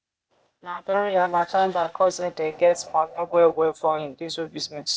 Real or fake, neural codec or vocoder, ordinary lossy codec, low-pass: fake; codec, 16 kHz, 0.8 kbps, ZipCodec; none; none